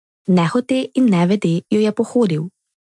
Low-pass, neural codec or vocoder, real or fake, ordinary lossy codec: 10.8 kHz; none; real; MP3, 64 kbps